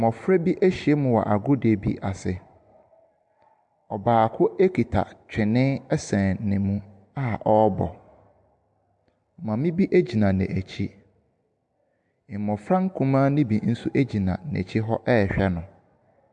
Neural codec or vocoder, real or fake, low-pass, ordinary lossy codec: none; real; 10.8 kHz; MP3, 64 kbps